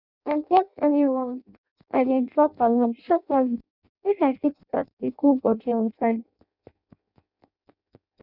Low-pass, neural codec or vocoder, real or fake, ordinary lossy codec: 5.4 kHz; codec, 16 kHz in and 24 kHz out, 0.6 kbps, FireRedTTS-2 codec; fake; none